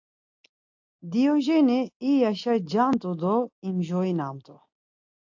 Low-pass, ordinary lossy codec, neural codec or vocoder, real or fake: 7.2 kHz; AAC, 48 kbps; none; real